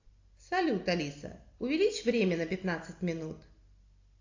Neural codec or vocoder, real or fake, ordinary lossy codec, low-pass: none; real; AAC, 48 kbps; 7.2 kHz